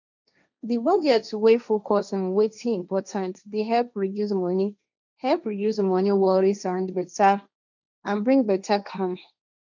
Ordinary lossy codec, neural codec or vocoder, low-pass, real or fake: none; codec, 16 kHz, 1.1 kbps, Voila-Tokenizer; 7.2 kHz; fake